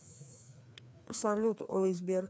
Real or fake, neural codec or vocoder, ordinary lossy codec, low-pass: fake; codec, 16 kHz, 2 kbps, FreqCodec, larger model; none; none